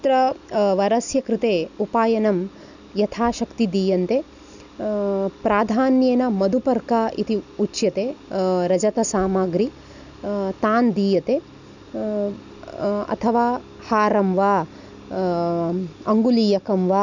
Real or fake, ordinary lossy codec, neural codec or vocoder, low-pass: real; none; none; 7.2 kHz